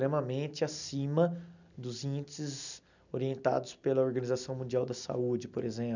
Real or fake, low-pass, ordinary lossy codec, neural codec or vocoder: real; 7.2 kHz; none; none